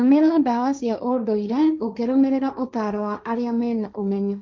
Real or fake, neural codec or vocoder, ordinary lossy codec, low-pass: fake; codec, 16 kHz, 1.1 kbps, Voila-Tokenizer; none; none